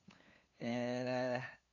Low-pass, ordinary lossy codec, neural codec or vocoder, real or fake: 7.2 kHz; none; codec, 16 kHz, 2 kbps, FunCodec, trained on LibriTTS, 25 frames a second; fake